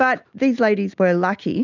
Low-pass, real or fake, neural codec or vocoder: 7.2 kHz; real; none